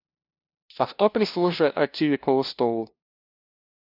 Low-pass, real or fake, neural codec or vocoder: 5.4 kHz; fake; codec, 16 kHz, 0.5 kbps, FunCodec, trained on LibriTTS, 25 frames a second